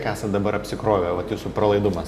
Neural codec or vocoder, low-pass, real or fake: none; 14.4 kHz; real